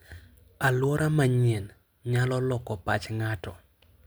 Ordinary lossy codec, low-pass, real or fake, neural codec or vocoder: none; none; real; none